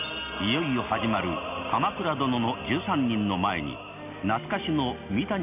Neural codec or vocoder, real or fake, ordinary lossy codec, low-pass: none; real; none; 3.6 kHz